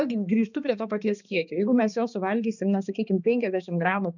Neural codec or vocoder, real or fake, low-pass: codec, 16 kHz, 2 kbps, X-Codec, HuBERT features, trained on balanced general audio; fake; 7.2 kHz